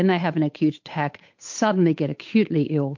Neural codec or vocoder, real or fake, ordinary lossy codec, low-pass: codec, 16 kHz, 8 kbps, FunCodec, trained on Chinese and English, 25 frames a second; fake; MP3, 48 kbps; 7.2 kHz